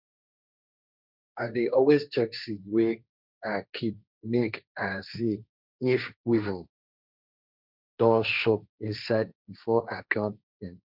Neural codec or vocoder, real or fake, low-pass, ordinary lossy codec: codec, 16 kHz, 1.1 kbps, Voila-Tokenizer; fake; 5.4 kHz; none